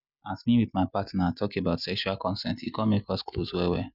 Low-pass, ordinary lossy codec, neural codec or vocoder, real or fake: 5.4 kHz; none; none; real